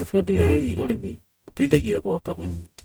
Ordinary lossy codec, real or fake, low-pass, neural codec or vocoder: none; fake; none; codec, 44.1 kHz, 0.9 kbps, DAC